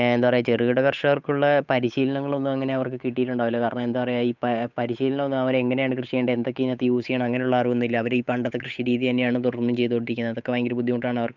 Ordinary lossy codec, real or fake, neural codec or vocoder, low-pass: none; fake; codec, 16 kHz, 8 kbps, FunCodec, trained on Chinese and English, 25 frames a second; 7.2 kHz